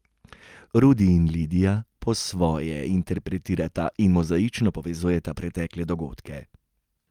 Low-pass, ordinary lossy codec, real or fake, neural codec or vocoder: 19.8 kHz; Opus, 32 kbps; real; none